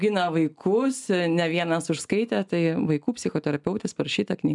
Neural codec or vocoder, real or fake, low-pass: none; real; 10.8 kHz